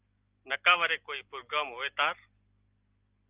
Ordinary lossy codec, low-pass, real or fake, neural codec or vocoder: Opus, 24 kbps; 3.6 kHz; real; none